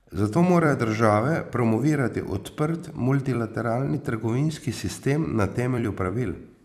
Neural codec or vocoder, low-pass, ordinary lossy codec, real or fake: vocoder, 44.1 kHz, 128 mel bands every 256 samples, BigVGAN v2; 14.4 kHz; none; fake